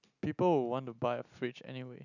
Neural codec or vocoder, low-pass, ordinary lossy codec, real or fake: none; 7.2 kHz; none; real